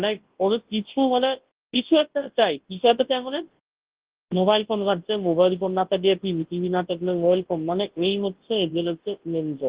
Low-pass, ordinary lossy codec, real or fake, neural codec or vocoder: 3.6 kHz; Opus, 16 kbps; fake; codec, 24 kHz, 0.9 kbps, WavTokenizer, large speech release